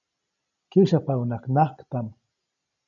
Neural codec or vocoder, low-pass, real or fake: none; 7.2 kHz; real